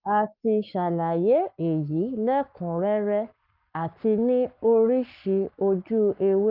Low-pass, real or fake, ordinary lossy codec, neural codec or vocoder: 5.4 kHz; fake; Opus, 24 kbps; codec, 44.1 kHz, 7.8 kbps, Pupu-Codec